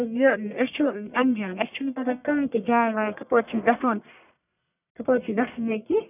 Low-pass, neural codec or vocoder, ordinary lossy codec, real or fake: 3.6 kHz; codec, 44.1 kHz, 1.7 kbps, Pupu-Codec; none; fake